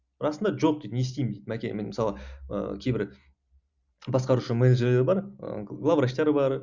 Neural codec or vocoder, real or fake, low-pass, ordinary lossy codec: none; real; 7.2 kHz; Opus, 64 kbps